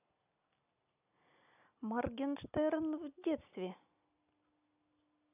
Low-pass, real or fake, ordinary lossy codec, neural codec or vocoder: 3.6 kHz; real; AAC, 32 kbps; none